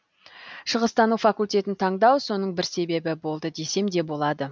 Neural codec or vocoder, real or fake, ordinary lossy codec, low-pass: none; real; none; none